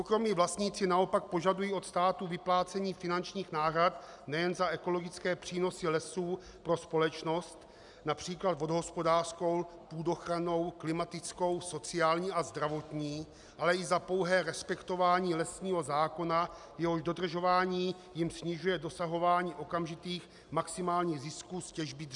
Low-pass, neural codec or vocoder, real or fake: 10.8 kHz; none; real